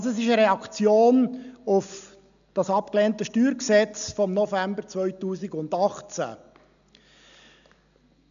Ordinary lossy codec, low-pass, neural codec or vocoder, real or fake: none; 7.2 kHz; none; real